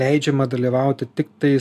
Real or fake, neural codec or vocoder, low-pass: real; none; 14.4 kHz